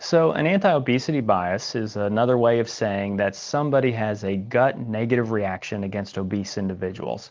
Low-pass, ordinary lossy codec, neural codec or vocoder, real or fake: 7.2 kHz; Opus, 24 kbps; none; real